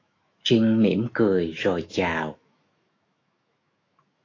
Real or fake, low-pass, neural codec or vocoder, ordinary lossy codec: fake; 7.2 kHz; autoencoder, 48 kHz, 128 numbers a frame, DAC-VAE, trained on Japanese speech; AAC, 32 kbps